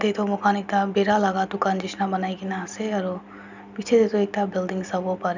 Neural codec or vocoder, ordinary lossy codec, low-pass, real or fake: none; none; 7.2 kHz; real